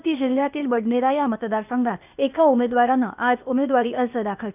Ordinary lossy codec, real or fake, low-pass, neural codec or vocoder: none; fake; 3.6 kHz; codec, 16 kHz, 0.8 kbps, ZipCodec